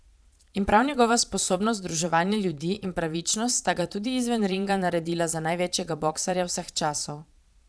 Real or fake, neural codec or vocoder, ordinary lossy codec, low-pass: fake; vocoder, 22.05 kHz, 80 mel bands, Vocos; none; none